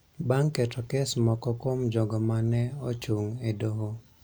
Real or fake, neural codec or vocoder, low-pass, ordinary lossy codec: real; none; none; none